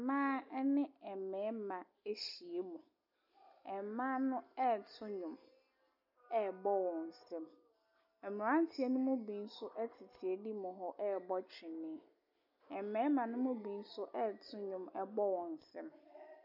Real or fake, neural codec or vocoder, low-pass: real; none; 5.4 kHz